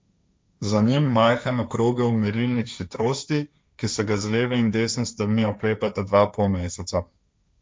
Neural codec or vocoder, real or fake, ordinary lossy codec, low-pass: codec, 16 kHz, 1.1 kbps, Voila-Tokenizer; fake; none; none